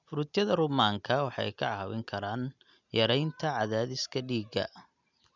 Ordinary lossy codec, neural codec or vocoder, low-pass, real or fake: none; none; 7.2 kHz; real